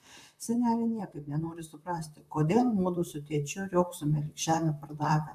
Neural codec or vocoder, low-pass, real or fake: vocoder, 44.1 kHz, 128 mel bands, Pupu-Vocoder; 14.4 kHz; fake